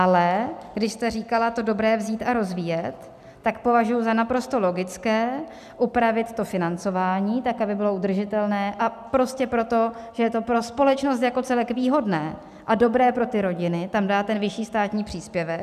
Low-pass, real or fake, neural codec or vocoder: 14.4 kHz; real; none